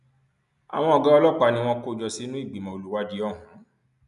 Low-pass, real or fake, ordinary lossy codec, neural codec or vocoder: 10.8 kHz; real; none; none